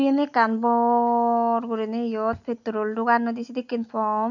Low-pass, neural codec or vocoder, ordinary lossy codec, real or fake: 7.2 kHz; none; none; real